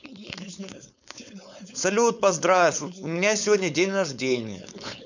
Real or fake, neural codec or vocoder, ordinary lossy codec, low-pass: fake; codec, 16 kHz, 4.8 kbps, FACodec; none; 7.2 kHz